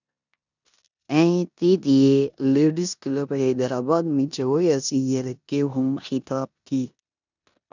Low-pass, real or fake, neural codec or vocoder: 7.2 kHz; fake; codec, 16 kHz in and 24 kHz out, 0.9 kbps, LongCat-Audio-Codec, four codebook decoder